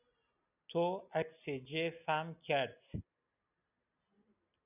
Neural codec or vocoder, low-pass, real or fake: none; 3.6 kHz; real